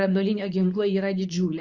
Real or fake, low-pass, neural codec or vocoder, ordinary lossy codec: fake; 7.2 kHz; codec, 24 kHz, 0.9 kbps, WavTokenizer, medium speech release version 2; Opus, 64 kbps